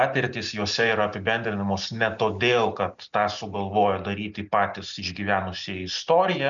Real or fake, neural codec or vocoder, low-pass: real; none; 7.2 kHz